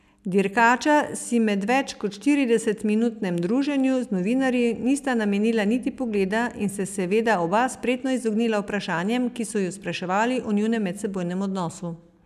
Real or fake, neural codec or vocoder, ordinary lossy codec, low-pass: real; none; none; 14.4 kHz